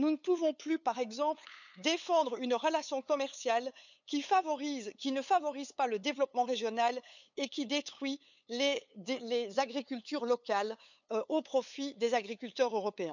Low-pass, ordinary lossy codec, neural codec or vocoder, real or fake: 7.2 kHz; none; codec, 16 kHz, 8 kbps, FunCodec, trained on LibriTTS, 25 frames a second; fake